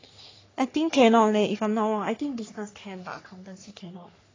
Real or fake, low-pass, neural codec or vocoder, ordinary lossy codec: fake; 7.2 kHz; codec, 44.1 kHz, 3.4 kbps, Pupu-Codec; AAC, 32 kbps